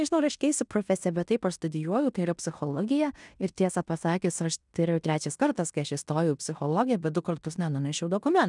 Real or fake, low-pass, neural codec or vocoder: fake; 10.8 kHz; codec, 16 kHz in and 24 kHz out, 0.9 kbps, LongCat-Audio-Codec, fine tuned four codebook decoder